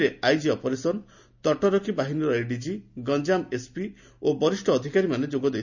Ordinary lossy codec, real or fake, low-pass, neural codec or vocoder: none; real; 7.2 kHz; none